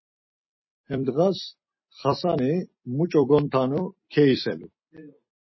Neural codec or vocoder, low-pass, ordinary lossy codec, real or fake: vocoder, 44.1 kHz, 128 mel bands every 256 samples, BigVGAN v2; 7.2 kHz; MP3, 24 kbps; fake